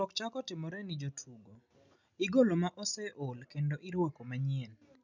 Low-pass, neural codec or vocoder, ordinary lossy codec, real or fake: 7.2 kHz; none; none; real